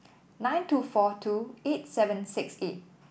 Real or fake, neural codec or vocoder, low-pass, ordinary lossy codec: real; none; none; none